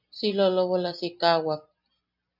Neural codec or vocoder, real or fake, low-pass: none; real; 5.4 kHz